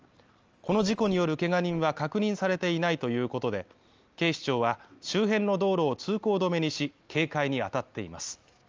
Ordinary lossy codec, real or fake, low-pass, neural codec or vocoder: Opus, 24 kbps; real; 7.2 kHz; none